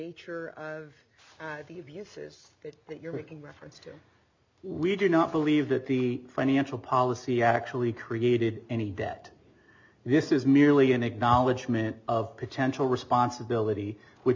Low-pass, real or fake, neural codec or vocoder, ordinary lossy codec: 7.2 kHz; real; none; MP3, 64 kbps